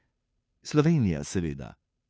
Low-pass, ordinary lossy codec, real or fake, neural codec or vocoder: none; none; fake; codec, 16 kHz, 2 kbps, FunCodec, trained on Chinese and English, 25 frames a second